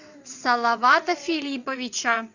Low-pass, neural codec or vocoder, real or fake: 7.2 kHz; vocoder, 22.05 kHz, 80 mel bands, WaveNeXt; fake